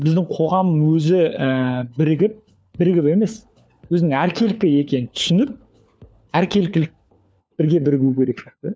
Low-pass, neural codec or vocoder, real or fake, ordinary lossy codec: none; codec, 16 kHz, 4 kbps, FunCodec, trained on LibriTTS, 50 frames a second; fake; none